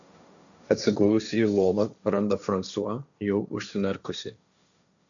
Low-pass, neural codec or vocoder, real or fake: 7.2 kHz; codec, 16 kHz, 1.1 kbps, Voila-Tokenizer; fake